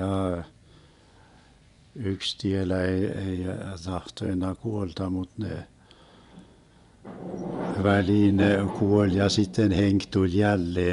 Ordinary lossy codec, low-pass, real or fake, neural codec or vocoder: none; 10.8 kHz; fake; vocoder, 24 kHz, 100 mel bands, Vocos